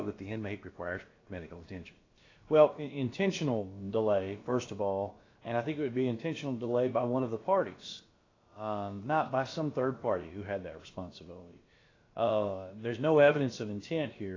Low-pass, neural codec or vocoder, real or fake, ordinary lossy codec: 7.2 kHz; codec, 16 kHz, about 1 kbps, DyCAST, with the encoder's durations; fake; AAC, 32 kbps